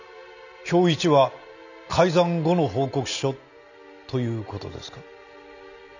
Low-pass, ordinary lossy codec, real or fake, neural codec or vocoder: 7.2 kHz; none; real; none